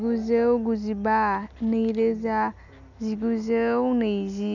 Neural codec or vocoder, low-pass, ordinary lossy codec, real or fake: none; 7.2 kHz; none; real